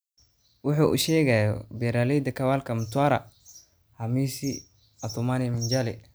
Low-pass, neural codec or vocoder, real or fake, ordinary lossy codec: none; none; real; none